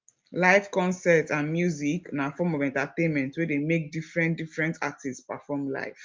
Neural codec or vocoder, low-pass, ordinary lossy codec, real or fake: none; 7.2 kHz; Opus, 24 kbps; real